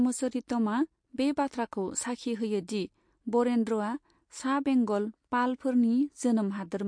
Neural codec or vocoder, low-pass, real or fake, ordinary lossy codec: none; 9.9 kHz; real; MP3, 48 kbps